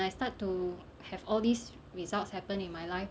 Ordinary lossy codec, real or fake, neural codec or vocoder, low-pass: none; real; none; none